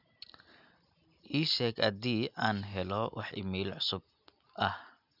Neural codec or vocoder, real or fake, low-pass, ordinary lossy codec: none; real; 5.4 kHz; none